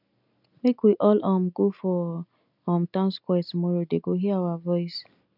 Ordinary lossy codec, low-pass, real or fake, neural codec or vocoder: none; 5.4 kHz; real; none